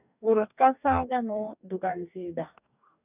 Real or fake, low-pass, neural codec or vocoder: fake; 3.6 kHz; codec, 44.1 kHz, 2.6 kbps, DAC